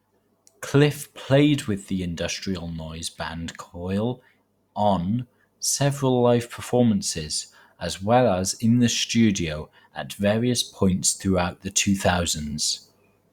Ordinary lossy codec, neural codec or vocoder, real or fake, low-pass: Opus, 64 kbps; vocoder, 44.1 kHz, 128 mel bands every 256 samples, BigVGAN v2; fake; 19.8 kHz